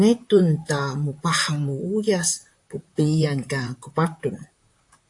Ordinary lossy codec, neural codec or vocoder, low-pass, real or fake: AAC, 64 kbps; vocoder, 44.1 kHz, 128 mel bands, Pupu-Vocoder; 10.8 kHz; fake